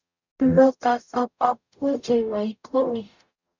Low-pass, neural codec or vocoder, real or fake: 7.2 kHz; codec, 44.1 kHz, 0.9 kbps, DAC; fake